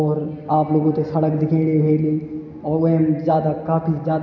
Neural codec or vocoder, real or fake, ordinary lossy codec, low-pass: none; real; none; 7.2 kHz